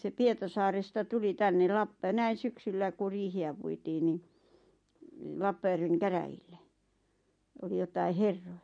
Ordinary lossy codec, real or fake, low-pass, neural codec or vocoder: MP3, 48 kbps; real; 9.9 kHz; none